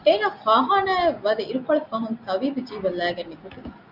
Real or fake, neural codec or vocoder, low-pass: real; none; 5.4 kHz